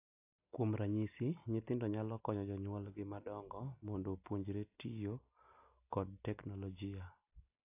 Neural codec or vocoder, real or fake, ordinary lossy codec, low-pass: none; real; AAC, 24 kbps; 3.6 kHz